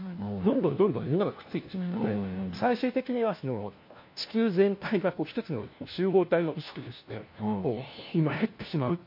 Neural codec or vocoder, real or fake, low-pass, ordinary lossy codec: codec, 16 kHz, 1 kbps, FunCodec, trained on LibriTTS, 50 frames a second; fake; 5.4 kHz; MP3, 32 kbps